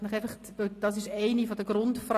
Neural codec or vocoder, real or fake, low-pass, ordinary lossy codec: none; real; 14.4 kHz; AAC, 48 kbps